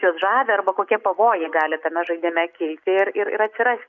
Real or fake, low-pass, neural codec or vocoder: real; 7.2 kHz; none